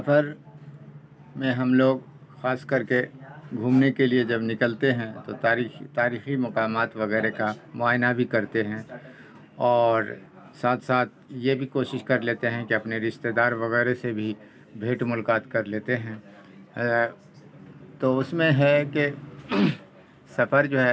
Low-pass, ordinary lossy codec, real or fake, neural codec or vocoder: none; none; real; none